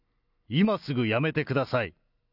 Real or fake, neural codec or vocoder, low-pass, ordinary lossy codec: real; none; 5.4 kHz; none